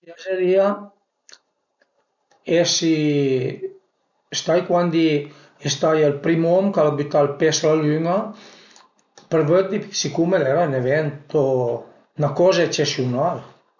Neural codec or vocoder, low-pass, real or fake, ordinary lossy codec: none; 7.2 kHz; real; none